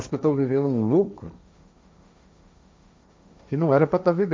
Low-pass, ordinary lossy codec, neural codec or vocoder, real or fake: none; none; codec, 16 kHz, 1.1 kbps, Voila-Tokenizer; fake